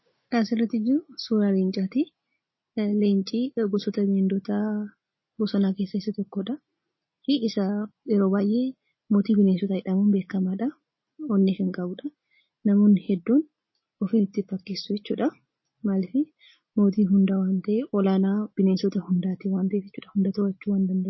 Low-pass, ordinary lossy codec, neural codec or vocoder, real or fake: 7.2 kHz; MP3, 24 kbps; none; real